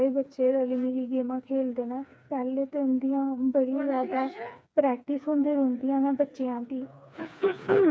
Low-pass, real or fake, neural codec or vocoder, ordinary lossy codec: none; fake; codec, 16 kHz, 4 kbps, FreqCodec, smaller model; none